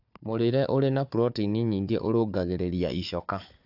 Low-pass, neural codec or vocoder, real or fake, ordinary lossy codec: 5.4 kHz; codec, 16 kHz, 4 kbps, FunCodec, trained on Chinese and English, 50 frames a second; fake; AAC, 48 kbps